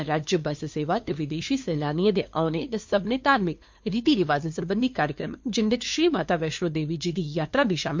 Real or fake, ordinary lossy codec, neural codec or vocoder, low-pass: fake; MP3, 48 kbps; codec, 16 kHz, 2 kbps, FunCodec, trained on LibriTTS, 25 frames a second; 7.2 kHz